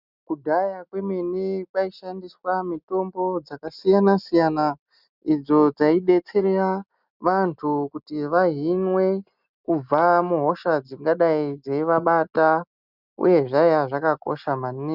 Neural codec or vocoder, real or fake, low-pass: none; real; 5.4 kHz